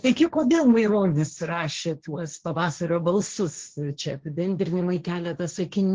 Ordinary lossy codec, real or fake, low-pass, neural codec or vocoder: Opus, 16 kbps; fake; 7.2 kHz; codec, 16 kHz, 1.1 kbps, Voila-Tokenizer